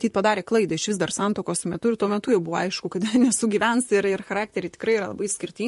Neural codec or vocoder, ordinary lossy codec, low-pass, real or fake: vocoder, 44.1 kHz, 128 mel bands, Pupu-Vocoder; MP3, 48 kbps; 14.4 kHz; fake